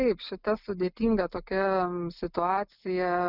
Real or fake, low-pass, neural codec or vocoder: real; 5.4 kHz; none